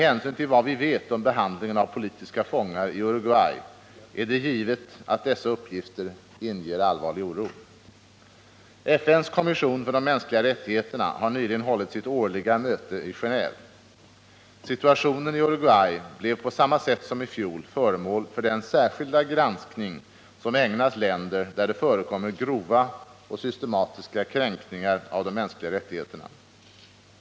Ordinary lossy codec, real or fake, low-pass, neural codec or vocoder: none; real; none; none